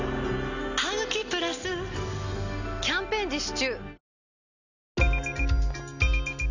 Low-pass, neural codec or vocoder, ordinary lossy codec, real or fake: 7.2 kHz; none; none; real